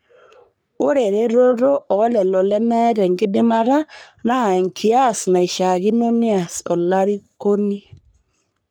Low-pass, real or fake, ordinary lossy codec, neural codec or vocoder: none; fake; none; codec, 44.1 kHz, 3.4 kbps, Pupu-Codec